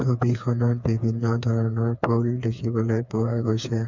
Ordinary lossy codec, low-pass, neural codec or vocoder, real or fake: none; 7.2 kHz; codec, 16 kHz, 4 kbps, FreqCodec, smaller model; fake